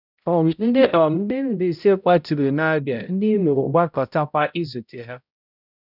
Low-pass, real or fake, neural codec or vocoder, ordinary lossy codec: 5.4 kHz; fake; codec, 16 kHz, 0.5 kbps, X-Codec, HuBERT features, trained on balanced general audio; none